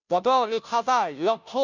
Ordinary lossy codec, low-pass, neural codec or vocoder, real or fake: none; 7.2 kHz; codec, 16 kHz, 0.5 kbps, FunCodec, trained on Chinese and English, 25 frames a second; fake